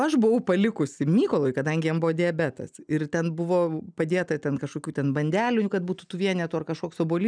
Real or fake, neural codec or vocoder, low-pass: real; none; 9.9 kHz